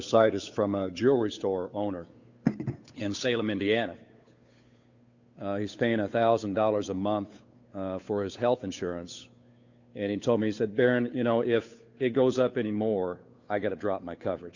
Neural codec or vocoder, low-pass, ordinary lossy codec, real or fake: codec, 16 kHz, 8 kbps, FunCodec, trained on Chinese and English, 25 frames a second; 7.2 kHz; Opus, 64 kbps; fake